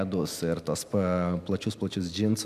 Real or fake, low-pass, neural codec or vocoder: real; 14.4 kHz; none